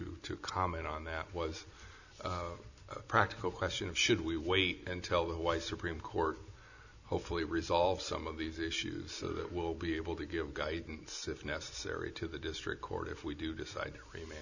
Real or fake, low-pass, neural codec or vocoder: real; 7.2 kHz; none